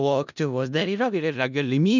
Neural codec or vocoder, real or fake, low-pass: codec, 16 kHz in and 24 kHz out, 0.4 kbps, LongCat-Audio-Codec, four codebook decoder; fake; 7.2 kHz